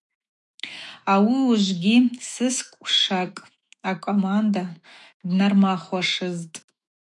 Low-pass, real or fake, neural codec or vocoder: 10.8 kHz; fake; autoencoder, 48 kHz, 128 numbers a frame, DAC-VAE, trained on Japanese speech